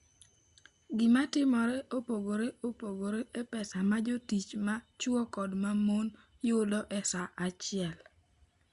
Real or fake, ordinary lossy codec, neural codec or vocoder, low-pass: real; Opus, 64 kbps; none; 10.8 kHz